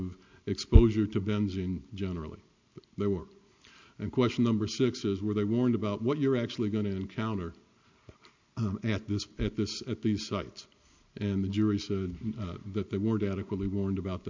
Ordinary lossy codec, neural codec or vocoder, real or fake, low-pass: MP3, 64 kbps; none; real; 7.2 kHz